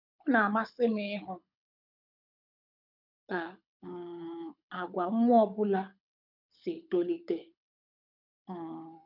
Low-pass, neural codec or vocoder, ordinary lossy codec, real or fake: 5.4 kHz; codec, 24 kHz, 6 kbps, HILCodec; none; fake